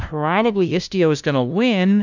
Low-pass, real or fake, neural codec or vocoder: 7.2 kHz; fake; codec, 16 kHz, 0.5 kbps, FunCodec, trained on LibriTTS, 25 frames a second